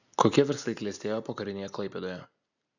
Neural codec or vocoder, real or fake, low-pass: none; real; 7.2 kHz